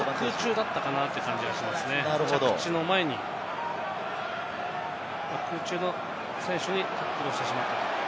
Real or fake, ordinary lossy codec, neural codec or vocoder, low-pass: real; none; none; none